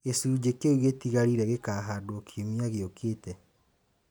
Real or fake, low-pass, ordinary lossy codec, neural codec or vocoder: real; none; none; none